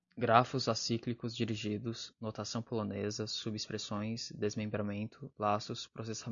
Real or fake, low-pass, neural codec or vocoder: real; 7.2 kHz; none